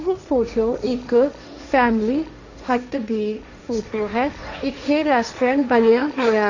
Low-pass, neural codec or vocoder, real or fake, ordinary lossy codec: 7.2 kHz; codec, 16 kHz, 1.1 kbps, Voila-Tokenizer; fake; none